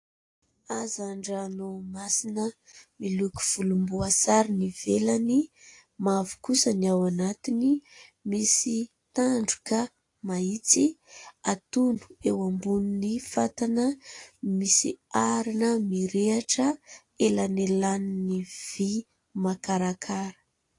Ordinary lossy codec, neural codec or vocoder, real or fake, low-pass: AAC, 48 kbps; none; real; 10.8 kHz